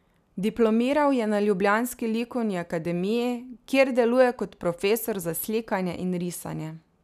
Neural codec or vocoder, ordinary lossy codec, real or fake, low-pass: none; none; real; 14.4 kHz